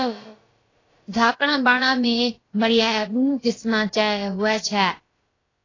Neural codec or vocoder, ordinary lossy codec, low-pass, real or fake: codec, 16 kHz, about 1 kbps, DyCAST, with the encoder's durations; AAC, 32 kbps; 7.2 kHz; fake